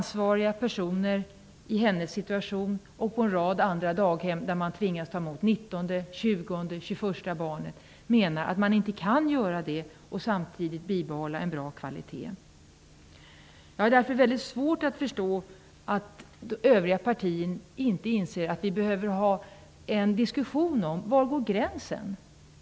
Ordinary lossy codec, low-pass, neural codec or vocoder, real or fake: none; none; none; real